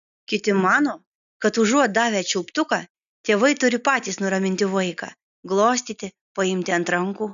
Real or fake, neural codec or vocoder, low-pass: real; none; 7.2 kHz